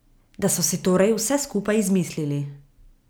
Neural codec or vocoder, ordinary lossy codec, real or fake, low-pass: none; none; real; none